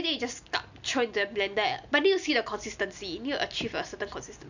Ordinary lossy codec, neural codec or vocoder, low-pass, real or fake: none; none; 7.2 kHz; real